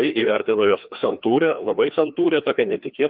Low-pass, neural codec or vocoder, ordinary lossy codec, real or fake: 5.4 kHz; codec, 16 kHz, 2 kbps, FreqCodec, larger model; Opus, 24 kbps; fake